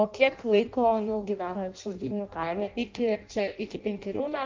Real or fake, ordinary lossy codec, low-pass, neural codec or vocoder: fake; Opus, 24 kbps; 7.2 kHz; codec, 16 kHz in and 24 kHz out, 0.6 kbps, FireRedTTS-2 codec